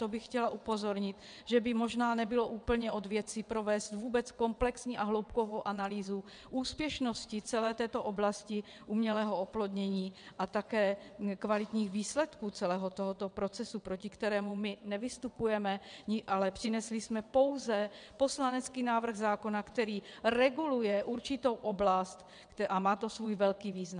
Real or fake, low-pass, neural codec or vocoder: fake; 9.9 kHz; vocoder, 22.05 kHz, 80 mel bands, WaveNeXt